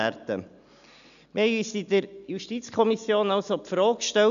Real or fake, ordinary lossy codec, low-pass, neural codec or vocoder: real; none; 7.2 kHz; none